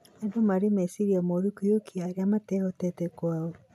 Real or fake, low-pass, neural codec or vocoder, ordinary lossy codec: real; 14.4 kHz; none; none